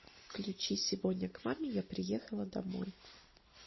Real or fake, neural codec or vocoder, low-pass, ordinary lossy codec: real; none; 7.2 kHz; MP3, 24 kbps